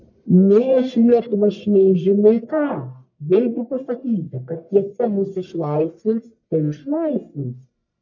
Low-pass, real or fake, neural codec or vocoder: 7.2 kHz; fake; codec, 44.1 kHz, 1.7 kbps, Pupu-Codec